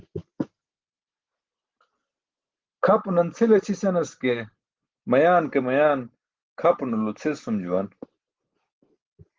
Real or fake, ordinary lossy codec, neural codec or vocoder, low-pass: real; Opus, 16 kbps; none; 7.2 kHz